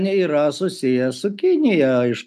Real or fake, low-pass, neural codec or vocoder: real; 14.4 kHz; none